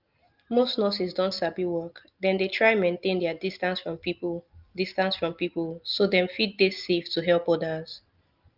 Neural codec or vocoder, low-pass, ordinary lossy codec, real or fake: none; 5.4 kHz; Opus, 24 kbps; real